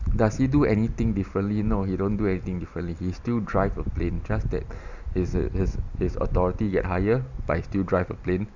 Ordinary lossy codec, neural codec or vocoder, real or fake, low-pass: Opus, 64 kbps; none; real; 7.2 kHz